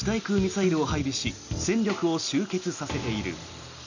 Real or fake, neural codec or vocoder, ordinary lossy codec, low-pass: real; none; none; 7.2 kHz